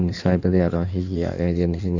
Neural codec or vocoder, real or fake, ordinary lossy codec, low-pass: codec, 16 kHz in and 24 kHz out, 1.1 kbps, FireRedTTS-2 codec; fake; none; 7.2 kHz